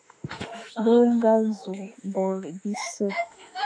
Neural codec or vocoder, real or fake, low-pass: autoencoder, 48 kHz, 32 numbers a frame, DAC-VAE, trained on Japanese speech; fake; 9.9 kHz